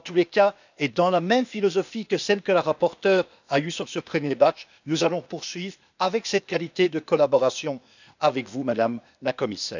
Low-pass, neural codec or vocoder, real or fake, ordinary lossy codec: 7.2 kHz; codec, 16 kHz, 0.8 kbps, ZipCodec; fake; none